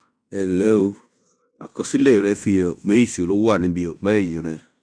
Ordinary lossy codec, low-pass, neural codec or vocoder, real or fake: none; 9.9 kHz; codec, 16 kHz in and 24 kHz out, 0.9 kbps, LongCat-Audio-Codec, fine tuned four codebook decoder; fake